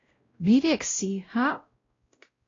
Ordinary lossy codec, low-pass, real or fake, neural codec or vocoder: AAC, 32 kbps; 7.2 kHz; fake; codec, 16 kHz, 0.5 kbps, X-Codec, WavLM features, trained on Multilingual LibriSpeech